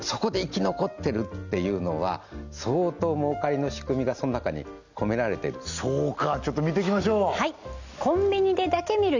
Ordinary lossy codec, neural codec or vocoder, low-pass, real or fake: none; none; 7.2 kHz; real